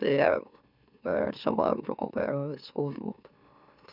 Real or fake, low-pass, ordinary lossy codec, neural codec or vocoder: fake; 5.4 kHz; none; autoencoder, 44.1 kHz, a latent of 192 numbers a frame, MeloTTS